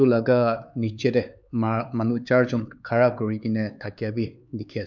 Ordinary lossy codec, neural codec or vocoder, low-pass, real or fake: none; codec, 16 kHz, 4 kbps, X-Codec, HuBERT features, trained on LibriSpeech; 7.2 kHz; fake